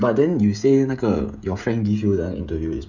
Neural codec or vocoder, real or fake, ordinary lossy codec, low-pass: codec, 16 kHz, 16 kbps, FreqCodec, smaller model; fake; none; 7.2 kHz